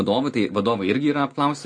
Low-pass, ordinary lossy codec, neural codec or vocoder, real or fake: 9.9 kHz; MP3, 48 kbps; none; real